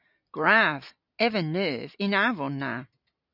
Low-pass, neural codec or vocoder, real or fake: 5.4 kHz; none; real